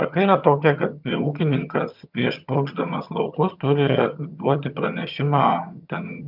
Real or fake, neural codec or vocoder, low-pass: fake; vocoder, 22.05 kHz, 80 mel bands, HiFi-GAN; 5.4 kHz